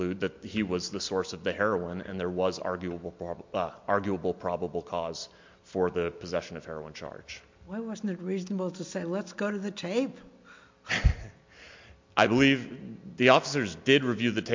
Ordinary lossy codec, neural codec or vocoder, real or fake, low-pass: MP3, 48 kbps; none; real; 7.2 kHz